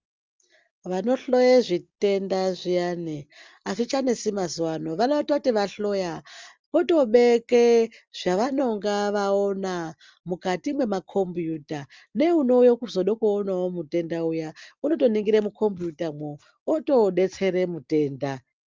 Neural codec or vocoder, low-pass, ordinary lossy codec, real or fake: none; 7.2 kHz; Opus, 32 kbps; real